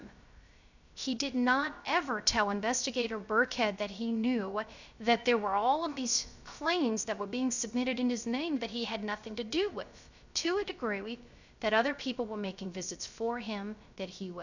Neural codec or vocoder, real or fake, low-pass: codec, 16 kHz, 0.3 kbps, FocalCodec; fake; 7.2 kHz